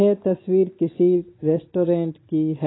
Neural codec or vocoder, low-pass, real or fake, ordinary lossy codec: none; 7.2 kHz; real; AAC, 16 kbps